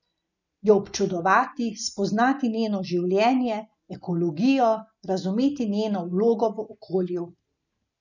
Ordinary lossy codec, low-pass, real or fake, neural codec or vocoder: none; 7.2 kHz; real; none